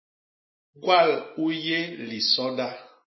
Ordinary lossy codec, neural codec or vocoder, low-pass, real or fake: MP3, 24 kbps; none; 7.2 kHz; real